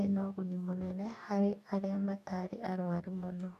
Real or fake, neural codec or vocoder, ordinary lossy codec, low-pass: fake; codec, 44.1 kHz, 2.6 kbps, DAC; none; 14.4 kHz